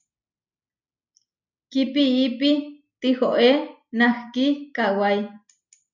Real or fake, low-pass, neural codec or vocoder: real; 7.2 kHz; none